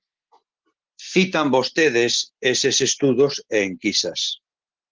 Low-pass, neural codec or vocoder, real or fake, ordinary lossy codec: 7.2 kHz; none; real; Opus, 16 kbps